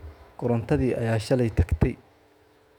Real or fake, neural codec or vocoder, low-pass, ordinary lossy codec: fake; autoencoder, 48 kHz, 128 numbers a frame, DAC-VAE, trained on Japanese speech; 19.8 kHz; none